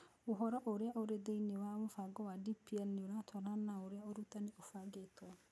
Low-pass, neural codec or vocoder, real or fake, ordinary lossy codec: none; none; real; none